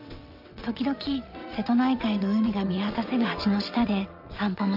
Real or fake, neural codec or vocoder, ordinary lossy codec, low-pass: fake; vocoder, 22.05 kHz, 80 mel bands, WaveNeXt; none; 5.4 kHz